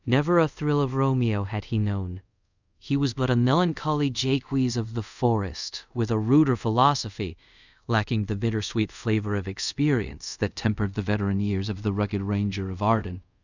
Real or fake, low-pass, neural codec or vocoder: fake; 7.2 kHz; codec, 24 kHz, 0.5 kbps, DualCodec